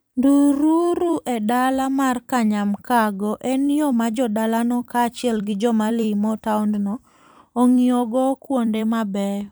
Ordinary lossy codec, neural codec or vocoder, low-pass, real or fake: none; vocoder, 44.1 kHz, 128 mel bands every 256 samples, BigVGAN v2; none; fake